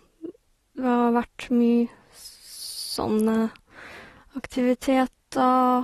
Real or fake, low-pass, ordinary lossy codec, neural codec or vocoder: real; 19.8 kHz; AAC, 32 kbps; none